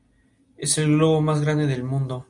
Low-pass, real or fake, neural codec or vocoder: 10.8 kHz; real; none